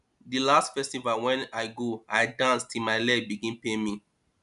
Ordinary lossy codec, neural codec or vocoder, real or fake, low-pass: none; none; real; 10.8 kHz